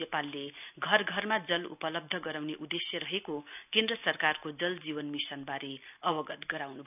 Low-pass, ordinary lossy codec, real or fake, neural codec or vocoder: 3.6 kHz; none; real; none